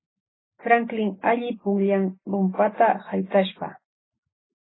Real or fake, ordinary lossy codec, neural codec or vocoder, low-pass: real; AAC, 16 kbps; none; 7.2 kHz